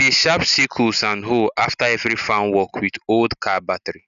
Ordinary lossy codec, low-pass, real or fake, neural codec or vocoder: none; 7.2 kHz; real; none